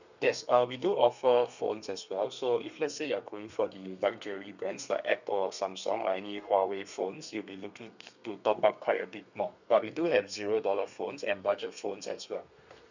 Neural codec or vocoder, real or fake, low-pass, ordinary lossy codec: codec, 32 kHz, 1.9 kbps, SNAC; fake; 7.2 kHz; none